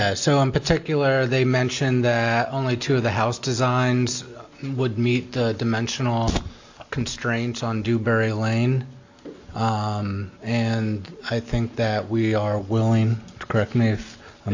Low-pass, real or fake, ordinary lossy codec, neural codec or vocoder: 7.2 kHz; real; AAC, 48 kbps; none